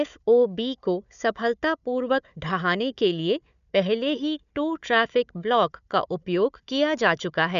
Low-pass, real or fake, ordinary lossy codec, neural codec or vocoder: 7.2 kHz; fake; none; codec, 16 kHz, 4 kbps, FunCodec, trained on Chinese and English, 50 frames a second